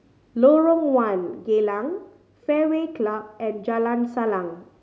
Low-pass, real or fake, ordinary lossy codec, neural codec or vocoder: none; real; none; none